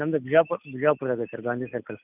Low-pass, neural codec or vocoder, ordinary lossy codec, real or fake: 3.6 kHz; none; none; real